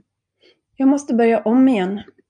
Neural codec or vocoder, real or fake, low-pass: none; real; 9.9 kHz